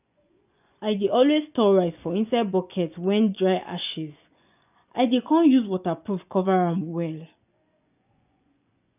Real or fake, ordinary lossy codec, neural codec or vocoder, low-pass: fake; none; vocoder, 24 kHz, 100 mel bands, Vocos; 3.6 kHz